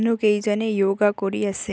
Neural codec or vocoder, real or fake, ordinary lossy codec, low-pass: none; real; none; none